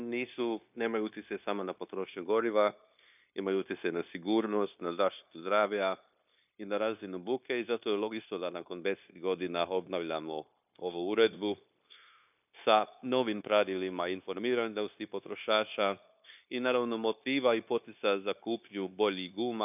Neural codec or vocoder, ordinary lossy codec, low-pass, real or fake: codec, 16 kHz, 0.9 kbps, LongCat-Audio-Codec; none; 3.6 kHz; fake